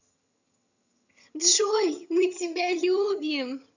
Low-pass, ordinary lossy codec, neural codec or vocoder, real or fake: 7.2 kHz; none; vocoder, 22.05 kHz, 80 mel bands, HiFi-GAN; fake